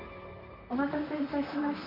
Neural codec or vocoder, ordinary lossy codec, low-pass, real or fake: vocoder, 44.1 kHz, 128 mel bands, Pupu-Vocoder; MP3, 48 kbps; 5.4 kHz; fake